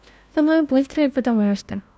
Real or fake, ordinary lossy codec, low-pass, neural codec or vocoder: fake; none; none; codec, 16 kHz, 1 kbps, FunCodec, trained on LibriTTS, 50 frames a second